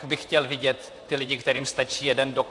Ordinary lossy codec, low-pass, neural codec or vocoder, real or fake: AAC, 64 kbps; 10.8 kHz; vocoder, 44.1 kHz, 128 mel bands, Pupu-Vocoder; fake